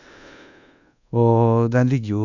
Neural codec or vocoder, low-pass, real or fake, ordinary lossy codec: codec, 24 kHz, 1.2 kbps, DualCodec; 7.2 kHz; fake; none